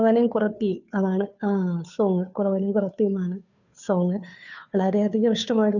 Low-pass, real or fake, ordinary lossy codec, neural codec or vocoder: 7.2 kHz; fake; none; codec, 16 kHz, 2 kbps, FunCodec, trained on Chinese and English, 25 frames a second